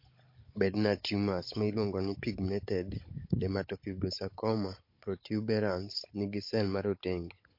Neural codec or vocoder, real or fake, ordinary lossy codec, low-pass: codec, 16 kHz, 16 kbps, FunCodec, trained on Chinese and English, 50 frames a second; fake; MP3, 32 kbps; 5.4 kHz